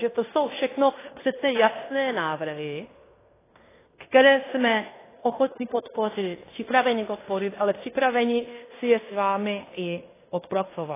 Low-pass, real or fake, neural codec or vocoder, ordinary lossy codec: 3.6 kHz; fake; codec, 16 kHz in and 24 kHz out, 0.9 kbps, LongCat-Audio-Codec, fine tuned four codebook decoder; AAC, 16 kbps